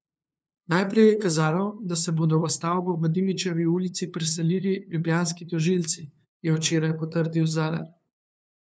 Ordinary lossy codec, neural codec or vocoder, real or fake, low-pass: none; codec, 16 kHz, 2 kbps, FunCodec, trained on LibriTTS, 25 frames a second; fake; none